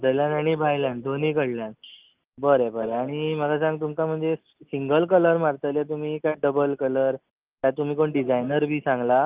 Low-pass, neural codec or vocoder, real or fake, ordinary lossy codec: 3.6 kHz; none; real; Opus, 24 kbps